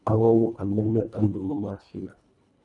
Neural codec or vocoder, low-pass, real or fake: codec, 24 kHz, 1.5 kbps, HILCodec; 10.8 kHz; fake